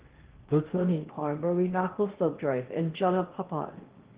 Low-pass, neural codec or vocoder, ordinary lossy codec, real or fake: 3.6 kHz; codec, 16 kHz in and 24 kHz out, 0.8 kbps, FocalCodec, streaming, 65536 codes; Opus, 16 kbps; fake